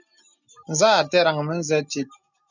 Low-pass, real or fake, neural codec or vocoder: 7.2 kHz; real; none